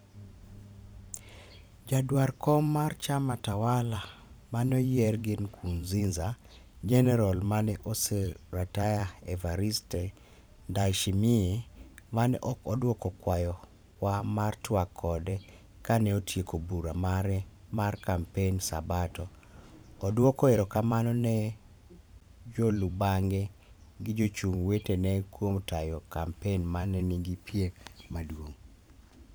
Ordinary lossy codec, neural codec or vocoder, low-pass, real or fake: none; vocoder, 44.1 kHz, 128 mel bands every 512 samples, BigVGAN v2; none; fake